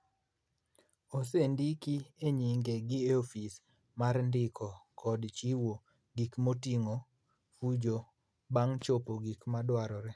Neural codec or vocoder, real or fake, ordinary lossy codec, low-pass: none; real; none; none